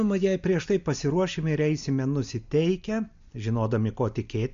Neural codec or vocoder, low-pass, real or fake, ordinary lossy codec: none; 7.2 kHz; real; MP3, 64 kbps